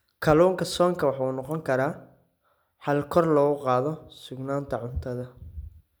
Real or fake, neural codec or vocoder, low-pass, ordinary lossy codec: real; none; none; none